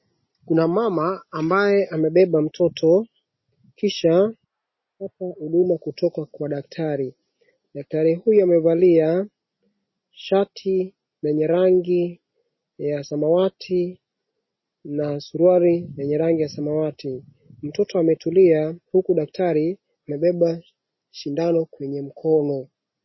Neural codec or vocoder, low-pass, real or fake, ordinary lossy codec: none; 7.2 kHz; real; MP3, 24 kbps